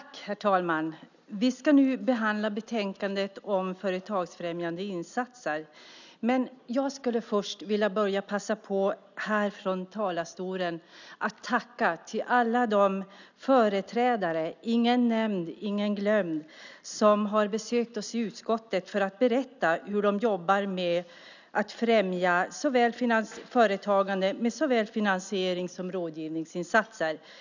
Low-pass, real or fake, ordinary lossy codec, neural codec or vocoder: 7.2 kHz; real; none; none